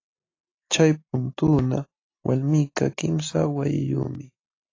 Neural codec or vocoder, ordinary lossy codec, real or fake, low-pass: none; AAC, 32 kbps; real; 7.2 kHz